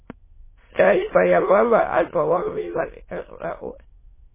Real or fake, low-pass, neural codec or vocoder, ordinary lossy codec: fake; 3.6 kHz; autoencoder, 22.05 kHz, a latent of 192 numbers a frame, VITS, trained on many speakers; MP3, 16 kbps